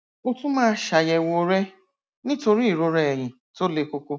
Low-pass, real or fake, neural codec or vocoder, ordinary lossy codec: none; real; none; none